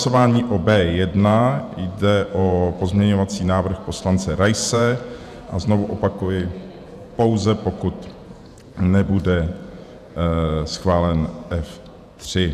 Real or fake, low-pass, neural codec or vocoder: fake; 14.4 kHz; vocoder, 48 kHz, 128 mel bands, Vocos